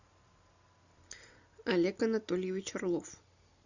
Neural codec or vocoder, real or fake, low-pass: none; real; 7.2 kHz